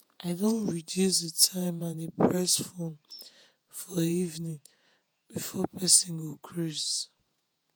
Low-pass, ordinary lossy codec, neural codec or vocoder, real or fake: none; none; vocoder, 48 kHz, 128 mel bands, Vocos; fake